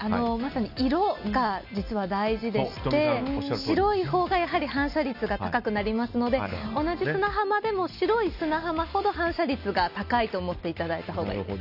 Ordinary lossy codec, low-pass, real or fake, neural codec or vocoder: none; 5.4 kHz; real; none